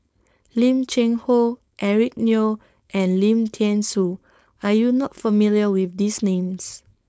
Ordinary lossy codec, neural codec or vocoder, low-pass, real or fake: none; codec, 16 kHz, 4.8 kbps, FACodec; none; fake